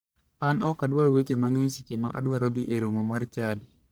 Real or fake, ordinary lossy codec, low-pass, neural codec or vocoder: fake; none; none; codec, 44.1 kHz, 1.7 kbps, Pupu-Codec